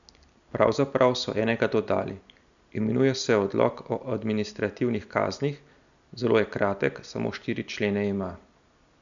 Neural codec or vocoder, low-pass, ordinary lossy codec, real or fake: none; 7.2 kHz; none; real